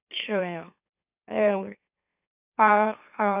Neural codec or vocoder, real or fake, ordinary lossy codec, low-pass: autoencoder, 44.1 kHz, a latent of 192 numbers a frame, MeloTTS; fake; none; 3.6 kHz